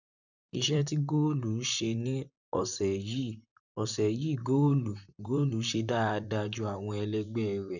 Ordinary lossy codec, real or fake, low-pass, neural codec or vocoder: none; fake; 7.2 kHz; vocoder, 44.1 kHz, 128 mel bands, Pupu-Vocoder